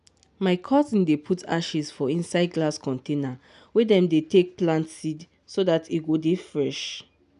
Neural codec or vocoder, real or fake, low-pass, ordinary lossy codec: none; real; 10.8 kHz; none